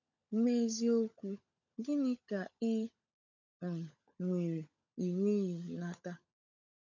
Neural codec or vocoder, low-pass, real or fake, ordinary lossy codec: codec, 16 kHz, 16 kbps, FunCodec, trained on LibriTTS, 50 frames a second; 7.2 kHz; fake; none